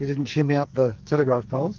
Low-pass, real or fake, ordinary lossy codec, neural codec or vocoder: 7.2 kHz; fake; Opus, 24 kbps; codec, 44.1 kHz, 2.6 kbps, SNAC